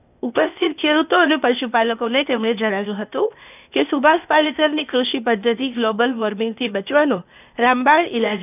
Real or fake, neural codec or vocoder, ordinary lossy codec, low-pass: fake; codec, 16 kHz, 0.8 kbps, ZipCodec; none; 3.6 kHz